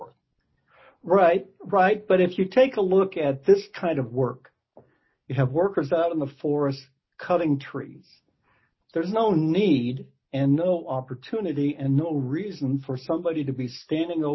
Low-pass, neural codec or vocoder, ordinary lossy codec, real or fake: 7.2 kHz; none; MP3, 24 kbps; real